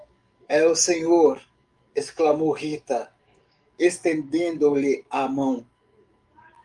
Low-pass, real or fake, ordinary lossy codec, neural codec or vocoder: 10.8 kHz; fake; Opus, 32 kbps; codec, 44.1 kHz, 7.8 kbps, DAC